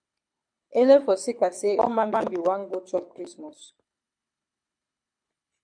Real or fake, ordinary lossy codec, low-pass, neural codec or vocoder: fake; MP3, 64 kbps; 9.9 kHz; codec, 24 kHz, 6 kbps, HILCodec